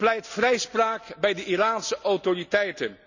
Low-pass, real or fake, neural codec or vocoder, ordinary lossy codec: 7.2 kHz; real; none; none